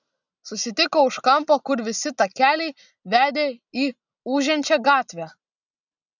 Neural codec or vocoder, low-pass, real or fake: none; 7.2 kHz; real